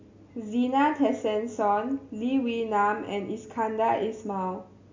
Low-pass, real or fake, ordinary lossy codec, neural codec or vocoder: 7.2 kHz; real; MP3, 48 kbps; none